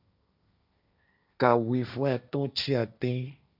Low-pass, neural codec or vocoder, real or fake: 5.4 kHz; codec, 16 kHz, 1.1 kbps, Voila-Tokenizer; fake